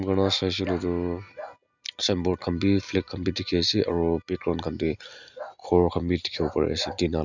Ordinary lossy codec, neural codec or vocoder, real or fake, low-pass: none; none; real; 7.2 kHz